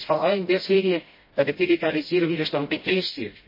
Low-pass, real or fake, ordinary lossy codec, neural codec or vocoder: 5.4 kHz; fake; MP3, 24 kbps; codec, 16 kHz, 0.5 kbps, FreqCodec, smaller model